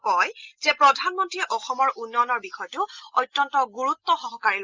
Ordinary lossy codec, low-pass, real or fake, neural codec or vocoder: Opus, 24 kbps; 7.2 kHz; real; none